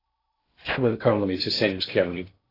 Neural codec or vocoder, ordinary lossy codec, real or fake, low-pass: codec, 16 kHz in and 24 kHz out, 0.8 kbps, FocalCodec, streaming, 65536 codes; AAC, 24 kbps; fake; 5.4 kHz